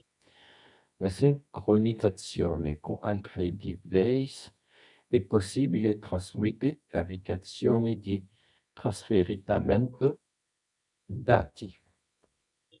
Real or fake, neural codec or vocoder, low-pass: fake; codec, 24 kHz, 0.9 kbps, WavTokenizer, medium music audio release; 10.8 kHz